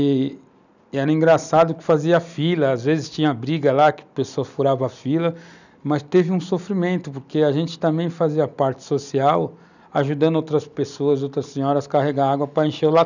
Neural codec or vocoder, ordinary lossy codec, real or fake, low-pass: none; none; real; 7.2 kHz